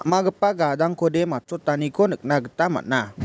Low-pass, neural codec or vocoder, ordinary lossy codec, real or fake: none; none; none; real